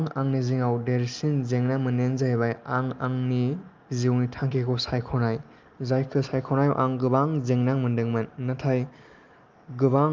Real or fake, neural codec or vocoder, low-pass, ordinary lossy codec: real; none; 7.2 kHz; Opus, 24 kbps